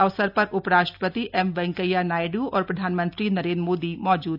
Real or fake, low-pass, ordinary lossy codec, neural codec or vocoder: real; 5.4 kHz; none; none